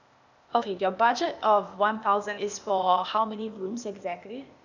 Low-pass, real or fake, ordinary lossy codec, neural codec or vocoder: 7.2 kHz; fake; none; codec, 16 kHz, 0.8 kbps, ZipCodec